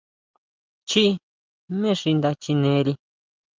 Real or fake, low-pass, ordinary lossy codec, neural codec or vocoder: real; 7.2 kHz; Opus, 32 kbps; none